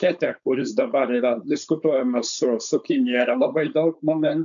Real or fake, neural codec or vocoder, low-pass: fake; codec, 16 kHz, 4.8 kbps, FACodec; 7.2 kHz